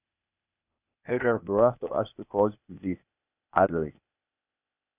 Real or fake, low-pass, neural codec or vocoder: fake; 3.6 kHz; codec, 16 kHz, 0.8 kbps, ZipCodec